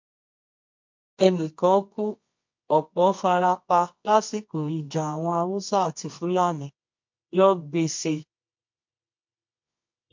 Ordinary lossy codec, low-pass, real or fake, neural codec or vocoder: MP3, 48 kbps; 7.2 kHz; fake; codec, 24 kHz, 0.9 kbps, WavTokenizer, medium music audio release